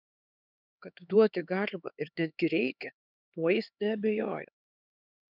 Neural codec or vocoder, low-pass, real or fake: codec, 16 kHz, 4 kbps, X-Codec, HuBERT features, trained on LibriSpeech; 5.4 kHz; fake